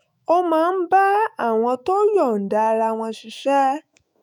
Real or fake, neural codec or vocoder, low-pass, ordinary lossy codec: fake; autoencoder, 48 kHz, 128 numbers a frame, DAC-VAE, trained on Japanese speech; 19.8 kHz; none